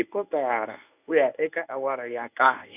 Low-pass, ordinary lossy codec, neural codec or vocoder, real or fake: 3.6 kHz; none; codec, 24 kHz, 0.9 kbps, WavTokenizer, medium speech release version 1; fake